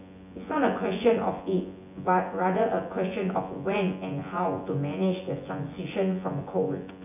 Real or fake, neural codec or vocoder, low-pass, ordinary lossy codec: fake; vocoder, 24 kHz, 100 mel bands, Vocos; 3.6 kHz; none